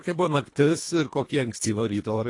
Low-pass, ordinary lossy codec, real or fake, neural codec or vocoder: 10.8 kHz; AAC, 48 kbps; fake; codec, 24 kHz, 1.5 kbps, HILCodec